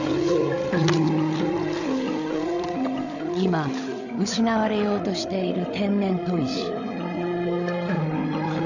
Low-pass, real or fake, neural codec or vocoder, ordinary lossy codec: 7.2 kHz; fake; codec, 16 kHz, 8 kbps, FreqCodec, larger model; none